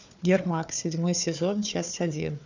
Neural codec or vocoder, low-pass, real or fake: codec, 16 kHz, 4 kbps, FunCodec, trained on Chinese and English, 50 frames a second; 7.2 kHz; fake